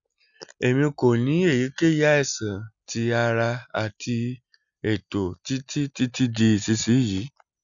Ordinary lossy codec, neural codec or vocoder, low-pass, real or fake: none; none; 7.2 kHz; real